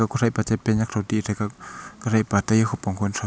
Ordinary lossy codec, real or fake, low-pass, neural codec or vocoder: none; real; none; none